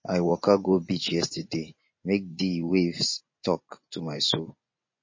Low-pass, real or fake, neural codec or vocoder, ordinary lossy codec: 7.2 kHz; fake; vocoder, 24 kHz, 100 mel bands, Vocos; MP3, 32 kbps